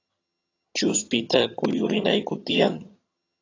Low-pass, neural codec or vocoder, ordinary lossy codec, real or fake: 7.2 kHz; vocoder, 22.05 kHz, 80 mel bands, HiFi-GAN; AAC, 32 kbps; fake